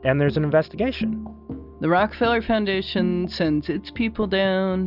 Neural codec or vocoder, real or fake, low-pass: none; real; 5.4 kHz